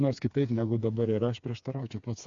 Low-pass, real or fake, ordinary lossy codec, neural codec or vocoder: 7.2 kHz; fake; AAC, 64 kbps; codec, 16 kHz, 4 kbps, FreqCodec, smaller model